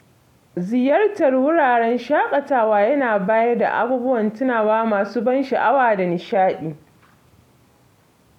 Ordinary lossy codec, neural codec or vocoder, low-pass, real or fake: none; none; 19.8 kHz; real